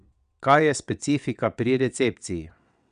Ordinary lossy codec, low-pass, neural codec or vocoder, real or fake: none; 9.9 kHz; vocoder, 22.05 kHz, 80 mel bands, WaveNeXt; fake